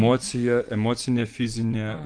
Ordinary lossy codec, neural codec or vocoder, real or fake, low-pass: Opus, 32 kbps; vocoder, 24 kHz, 100 mel bands, Vocos; fake; 9.9 kHz